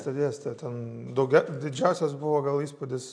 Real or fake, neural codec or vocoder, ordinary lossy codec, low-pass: real; none; AAC, 64 kbps; 9.9 kHz